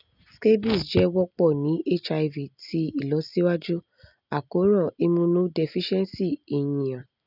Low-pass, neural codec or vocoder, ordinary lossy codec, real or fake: 5.4 kHz; none; none; real